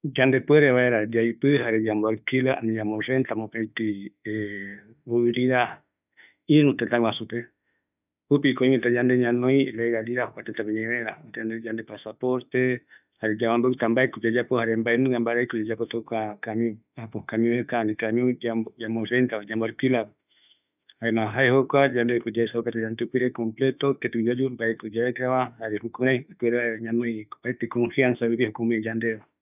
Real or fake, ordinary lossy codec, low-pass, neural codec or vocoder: fake; none; 3.6 kHz; autoencoder, 48 kHz, 32 numbers a frame, DAC-VAE, trained on Japanese speech